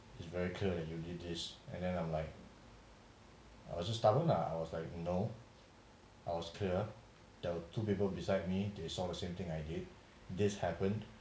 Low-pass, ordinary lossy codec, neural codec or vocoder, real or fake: none; none; none; real